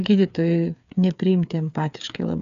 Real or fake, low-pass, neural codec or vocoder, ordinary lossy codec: fake; 7.2 kHz; codec, 16 kHz, 8 kbps, FreqCodec, smaller model; AAC, 96 kbps